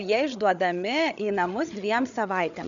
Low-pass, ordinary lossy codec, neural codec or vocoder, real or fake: 7.2 kHz; MP3, 96 kbps; codec, 16 kHz, 8 kbps, FreqCodec, larger model; fake